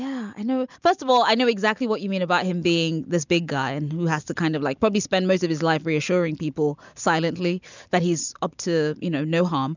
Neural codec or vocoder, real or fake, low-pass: none; real; 7.2 kHz